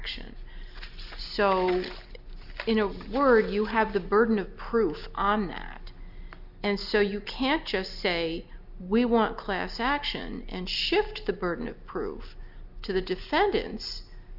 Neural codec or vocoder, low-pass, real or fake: none; 5.4 kHz; real